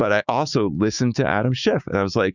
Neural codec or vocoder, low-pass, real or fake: codec, 16 kHz, 6 kbps, DAC; 7.2 kHz; fake